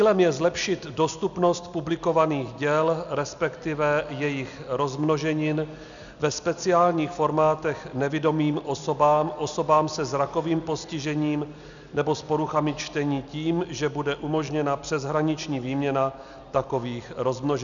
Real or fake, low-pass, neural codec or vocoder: real; 7.2 kHz; none